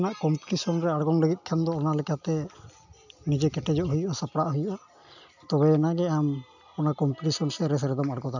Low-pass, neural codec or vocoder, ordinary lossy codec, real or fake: 7.2 kHz; none; none; real